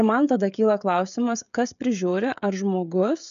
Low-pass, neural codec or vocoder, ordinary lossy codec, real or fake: 7.2 kHz; codec, 16 kHz, 16 kbps, FreqCodec, smaller model; MP3, 96 kbps; fake